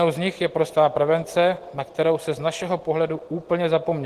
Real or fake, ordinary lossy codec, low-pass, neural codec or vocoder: real; Opus, 16 kbps; 14.4 kHz; none